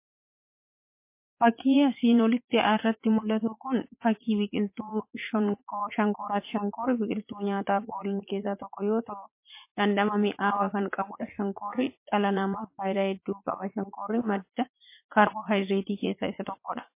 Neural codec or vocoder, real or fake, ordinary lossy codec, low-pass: vocoder, 44.1 kHz, 80 mel bands, Vocos; fake; MP3, 24 kbps; 3.6 kHz